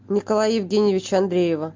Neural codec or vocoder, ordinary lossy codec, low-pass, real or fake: none; MP3, 64 kbps; 7.2 kHz; real